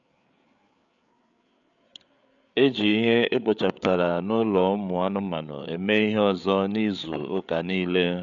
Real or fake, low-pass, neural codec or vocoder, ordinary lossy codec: fake; 7.2 kHz; codec, 16 kHz, 8 kbps, FreqCodec, larger model; none